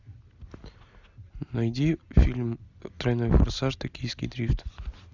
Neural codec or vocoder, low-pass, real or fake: none; 7.2 kHz; real